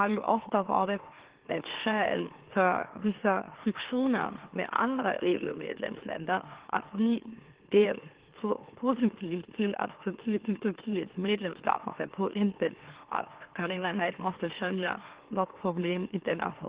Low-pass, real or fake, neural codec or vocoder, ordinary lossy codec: 3.6 kHz; fake; autoencoder, 44.1 kHz, a latent of 192 numbers a frame, MeloTTS; Opus, 16 kbps